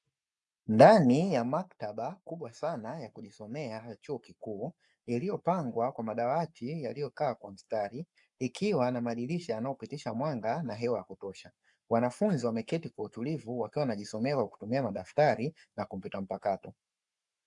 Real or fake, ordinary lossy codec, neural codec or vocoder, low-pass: fake; MP3, 96 kbps; codec, 44.1 kHz, 7.8 kbps, Pupu-Codec; 10.8 kHz